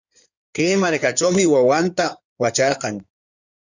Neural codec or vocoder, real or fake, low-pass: codec, 16 kHz in and 24 kHz out, 2.2 kbps, FireRedTTS-2 codec; fake; 7.2 kHz